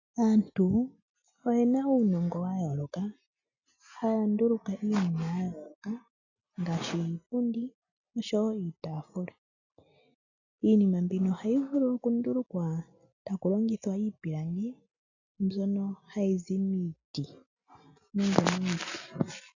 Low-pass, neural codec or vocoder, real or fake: 7.2 kHz; none; real